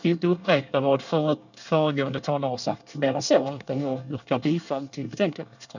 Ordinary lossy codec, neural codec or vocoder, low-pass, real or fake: none; codec, 24 kHz, 1 kbps, SNAC; 7.2 kHz; fake